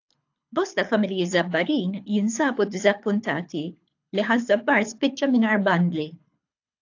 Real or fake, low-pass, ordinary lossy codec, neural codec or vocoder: fake; 7.2 kHz; AAC, 48 kbps; codec, 24 kHz, 6 kbps, HILCodec